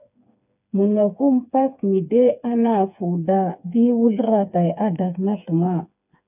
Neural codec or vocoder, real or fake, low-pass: codec, 16 kHz, 4 kbps, FreqCodec, smaller model; fake; 3.6 kHz